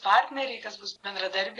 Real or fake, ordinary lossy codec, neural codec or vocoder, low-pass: real; AAC, 48 kbps; none; 10.8 kHz